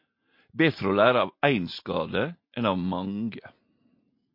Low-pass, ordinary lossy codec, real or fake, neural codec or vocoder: 5.4 kHz; MP3, 32 kbps; real; none